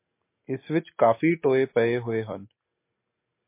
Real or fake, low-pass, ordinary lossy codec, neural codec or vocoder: real; 3.6 kHz; MP3, 24 kbps; none